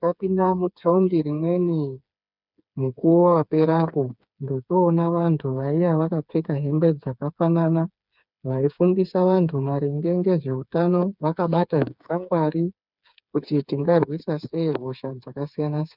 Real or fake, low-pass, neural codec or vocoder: fake; 5.4 kHz; codec, 16 kHz, 4 kbps, FreqCodec, smaller model